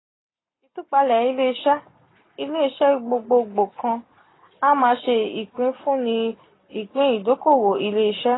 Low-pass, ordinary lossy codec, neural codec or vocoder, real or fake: 7.2 kHz; AAC, 16 kbps; none; real